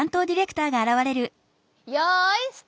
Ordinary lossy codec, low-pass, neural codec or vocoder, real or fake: none; none; none; real